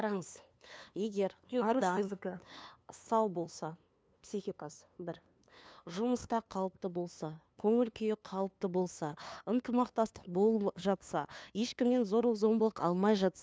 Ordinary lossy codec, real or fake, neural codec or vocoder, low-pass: none; fake; codec, 16 kHz, 2 kbps, FunCodec, trained on LibriTTS, 25 frames a second; none